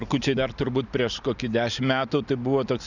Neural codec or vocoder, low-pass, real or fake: none; 7.2 kHz; real